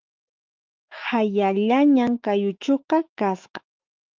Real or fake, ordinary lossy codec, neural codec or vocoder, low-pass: fake; Opus, 24 kbps; autoencoder, 48 kHz, 128 numbers a frame, DAC-VAE, trained on Japanese speech; 7.2 kHz